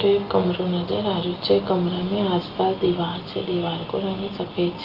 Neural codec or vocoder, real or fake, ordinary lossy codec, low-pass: none; real; Opus, 32 kbps; 5.4 kHz